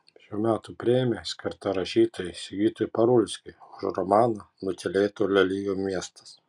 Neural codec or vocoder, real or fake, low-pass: none; real; 10.8 kHz